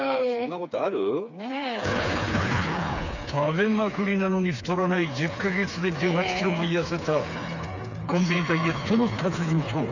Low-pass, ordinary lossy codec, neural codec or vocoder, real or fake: 7.2 kHz; none; codec, 16 kHz, 4 kbps, FreqCodec, smaller model; fake